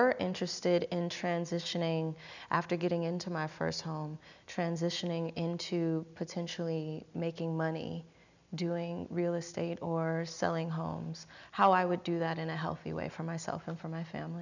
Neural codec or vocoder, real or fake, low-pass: none; real; 7.2 kHz